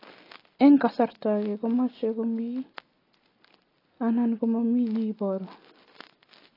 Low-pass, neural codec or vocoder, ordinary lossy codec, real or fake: 5.4 kHz; none; AAC, 24 kbps; real